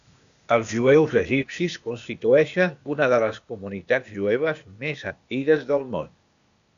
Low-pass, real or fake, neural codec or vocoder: 7.2 kHz; fake; codec, 16 kHz, 0.8 kbps, ZipCodec